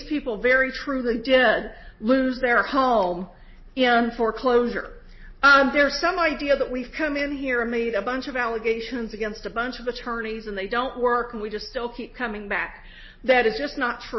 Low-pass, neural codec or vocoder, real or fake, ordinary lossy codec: 7.2 kHz; none; real; MP3, 24 kbps